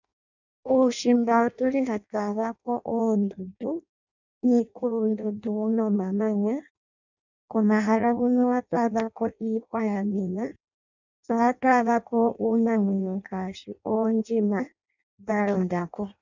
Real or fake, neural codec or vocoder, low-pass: fake; codec, 16 kHz in and 24 kHz out, 0.6 kbps, FireRedTTS-2 codec; 7.2 kHz